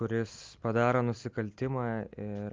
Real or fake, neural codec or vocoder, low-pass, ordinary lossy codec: real; none; 7.2 kHz; Opus, 24 kbps